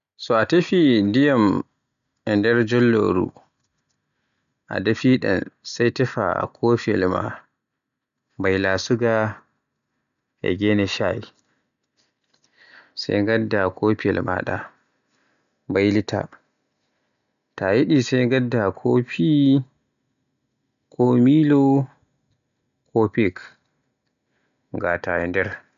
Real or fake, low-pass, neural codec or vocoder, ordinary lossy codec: real; 7.2 kHz; none; none